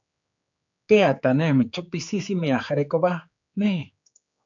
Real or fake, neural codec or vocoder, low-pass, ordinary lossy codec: fake; codec, 16 kHz, 4 kbps, X-Codec, HuBERT features, trained on general audio; 7.2 kHz; AAC, 64 kbps